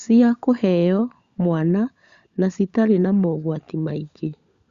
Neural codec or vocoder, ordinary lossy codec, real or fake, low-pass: codec, 16 kHz, 16 kbps, FunCodec, trained on LibriTTS, 50 frames a second; Opus, 64 kbps; fake; 7.2 kHz